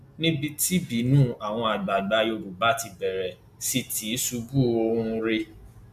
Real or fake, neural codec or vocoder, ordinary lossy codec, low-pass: real; none; none; 14.4 kHz